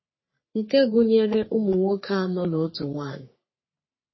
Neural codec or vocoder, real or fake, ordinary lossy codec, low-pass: codec, 44.1 kHz, 3.4 kbps, Pupu-Codec; fake; MP3, 24 kbps; 7.2 kHz